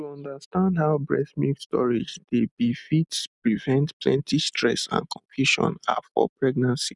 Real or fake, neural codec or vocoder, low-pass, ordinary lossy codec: real; none; 10.8 kHz; none